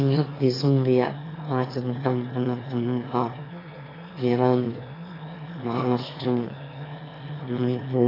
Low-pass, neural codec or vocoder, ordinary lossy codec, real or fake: 5.4 kHz; autoencoder, 22.05 kHz, a latent of 192 numbers a frame, VITS, trained on one speaker; AAC, 24 kbps; fake